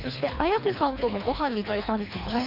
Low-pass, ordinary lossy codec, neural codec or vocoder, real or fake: 5.4 kHz; none; codec, 24 kHz, 3 kbps, HILCodec; fake